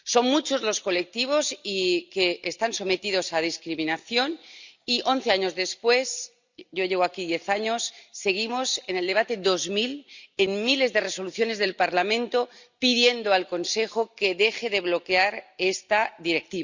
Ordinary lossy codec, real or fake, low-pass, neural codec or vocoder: Opus, 64 kbps; real; 7.2 kHz; none